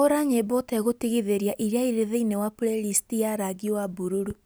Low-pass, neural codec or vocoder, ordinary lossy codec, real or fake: none; none; none; real